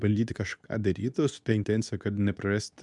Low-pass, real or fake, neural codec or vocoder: 10.8 kHz; fake; codec, 24 kHz, 0.9 kbps, WavTokenizer, medium speech release version 2